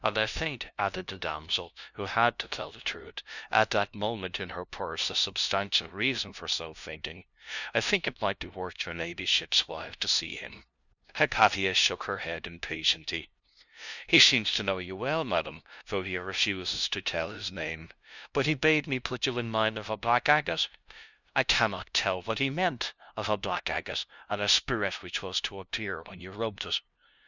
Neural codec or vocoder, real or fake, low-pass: codec, 16 kHz, 0.5 kbps, FunCodec, trained on LibriTTS, 25 frames a second; fake; 7.2 kHz